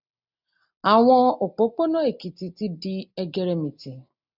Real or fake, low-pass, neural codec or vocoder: real; 5.4 kHz; none